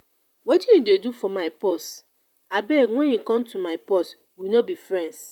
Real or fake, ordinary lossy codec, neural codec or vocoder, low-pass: fake; none; vocoder, 44.1 kHz, 128 mel bands, Pupu-Vocoder; 19.8 kHz